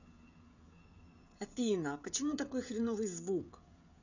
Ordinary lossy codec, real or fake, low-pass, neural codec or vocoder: none; fake; 7.2 kHz; codec, 16 kHz, 16 kbps, FreqCodec, smaller model